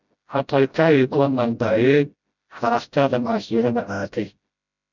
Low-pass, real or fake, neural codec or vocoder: 7.2 kHz; fake; codec, 16 kHz, 0.5 kbps, FreqCodec, smaller model